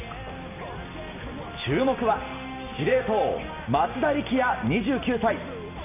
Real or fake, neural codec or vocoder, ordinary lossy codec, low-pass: real; none; none; 3.6 kHz